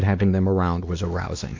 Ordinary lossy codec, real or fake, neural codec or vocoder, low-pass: AAC, 48 kbps; fake; codec, 16 kHz, 2 kbps, X-Codec, WavLM features, trained on Multilingual LibriSpeech; 7.2 kHz